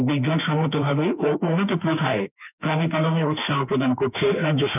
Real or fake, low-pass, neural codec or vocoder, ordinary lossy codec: fake; 3.6 kHz; autoencoder, 48 kHz, 32 numbers a frame, DAC-VAE, trained on Japanese speech; none